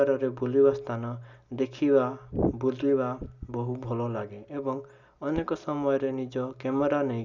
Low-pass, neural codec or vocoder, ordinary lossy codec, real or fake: 7.2 kHz; none; none; real